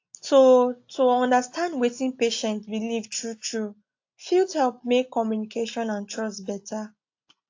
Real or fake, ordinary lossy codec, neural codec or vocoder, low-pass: real; AAC, 48 kbps; none; 7.2 kHz